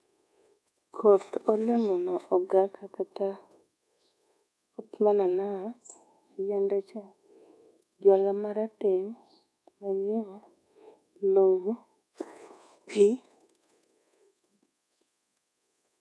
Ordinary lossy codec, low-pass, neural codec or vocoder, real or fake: none; none; codec, 24 kHz, 1.2 kbps, DualCodec; fake